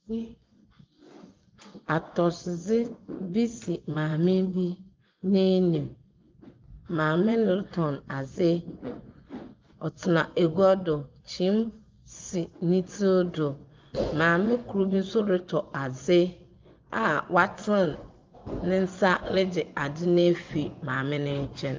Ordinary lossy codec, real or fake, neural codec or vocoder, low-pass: Opus, 24 kbps; fake; vocoder, 24 kHz, 100 mel bands, Vocos; 7.2 kHz